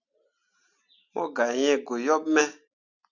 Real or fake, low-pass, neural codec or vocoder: real; 7.2 kHz; none